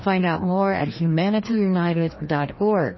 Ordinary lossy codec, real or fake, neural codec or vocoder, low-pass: MP3, 24 kbps; fake; codec, 16 kHz, 1 kbps, FreqCodec, larger model; 7.2 kHz